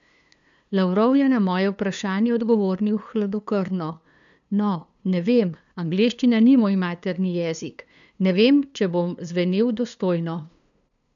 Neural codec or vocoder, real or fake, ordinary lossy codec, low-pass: codec, 16 kHz, 2 kbps, FunCodec, trained on LibriTTS, 25 frames a second; fake; none; 7.2 kHz